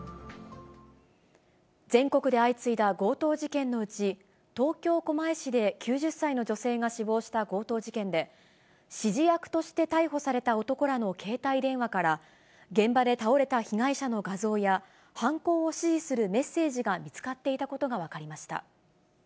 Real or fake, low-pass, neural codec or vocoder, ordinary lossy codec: real; none; none; none